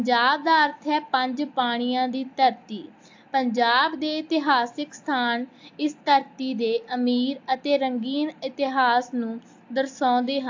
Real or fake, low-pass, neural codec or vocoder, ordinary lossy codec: real; 7.2 kHz; none; AAC, 48 kbps